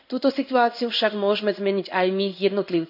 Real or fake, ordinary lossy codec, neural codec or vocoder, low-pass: fake; none; codec, 16 kHz in and 24 kHz out, 1 kbps, XY-Tokenizer; 5.4 kHz